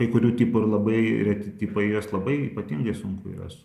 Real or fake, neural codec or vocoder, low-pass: real; none; 14.4 kHz